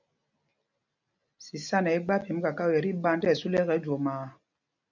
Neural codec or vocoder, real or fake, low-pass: none; real; 7.2 kHz